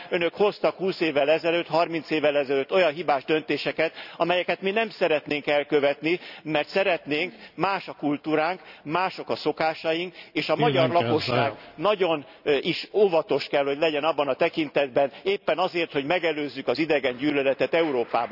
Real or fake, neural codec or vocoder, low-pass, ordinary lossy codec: real; none; 5.4 kHz; none